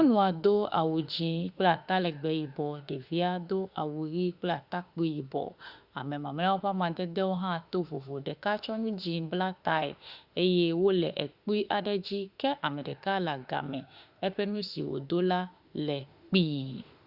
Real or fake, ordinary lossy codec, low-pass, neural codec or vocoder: fake; Opus, 64 kbps; 5.4 kHz; autoencoder, 48 kHz, 32 numbers a frame, DAC-VAE, trained on Japanese speech